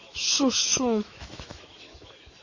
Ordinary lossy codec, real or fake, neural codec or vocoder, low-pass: MP3, 32 kbps; fake; autoencoder, 48 kHz, 128 numbers a frame, DAC-VAE, trained on Japanese speech; 7.2 kHz